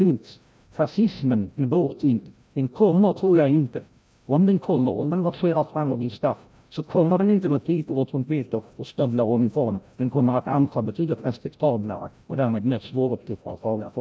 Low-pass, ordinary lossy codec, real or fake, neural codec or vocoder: none; none; fake; codec, 16 kHz, 0.5 kbps, FreqCodec, larger model